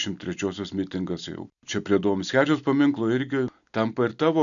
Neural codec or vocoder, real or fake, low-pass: none; real; 7.2 kHz